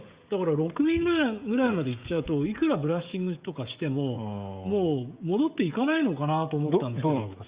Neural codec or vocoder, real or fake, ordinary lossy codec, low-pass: codec, 16 kHz, 16 kbps, FreqCodec, smaller model; fake; Opus, 64 kbps; 3.6 kHz